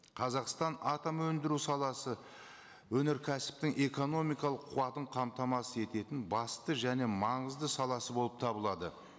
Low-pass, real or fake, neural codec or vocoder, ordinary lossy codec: none; real; none; none